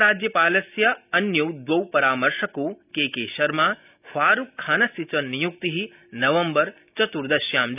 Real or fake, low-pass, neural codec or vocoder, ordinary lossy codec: real; 3.6 kHz; none; none